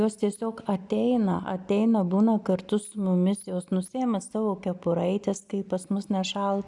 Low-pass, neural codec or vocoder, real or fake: 10.8 kHz; none; real